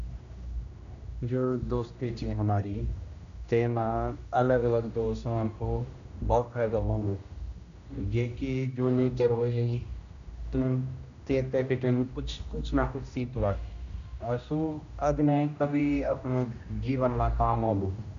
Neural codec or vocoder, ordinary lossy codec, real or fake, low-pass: codec, 16 kHz, 1 kbps, X-Codec, HuBERT features, trained on general audio; none; fake; 7.2 kHz